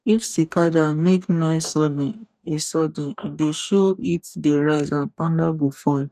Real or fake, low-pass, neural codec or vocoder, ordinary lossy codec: fake; 14.4 kHz; codec, 44.1 kHz, 2.6 kbps, DAC; none